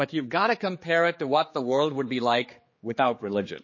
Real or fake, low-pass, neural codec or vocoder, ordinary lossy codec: fake; 7.2 kHz; codec, 16 kHz, 4 kbps, X-Codec, HuBERT features, trained on general audio; MP3, 32 kbps